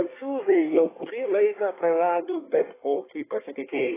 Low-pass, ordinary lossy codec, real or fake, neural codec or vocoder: 3.6 kHz; AAC, 16 kbps; fake; codec, 24 kHz, 1 kbps, SNAC